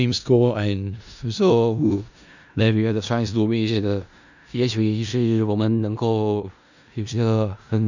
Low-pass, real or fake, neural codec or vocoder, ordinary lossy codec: 7.2 kHz; fake; codec, 16 kHz in and 24 kHz out, 0.4 kbps, LongCat-Audio-Codec, four codebook decoder; none